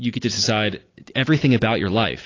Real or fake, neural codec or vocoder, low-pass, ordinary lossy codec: real; none; 7.2 kHz; AAC, 32 kbps